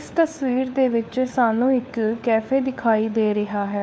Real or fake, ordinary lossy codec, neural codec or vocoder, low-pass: fake; none; codec, 16 kHz, 4 kbps, FunCodec, trained on LibriTTS, 50 frames a second; none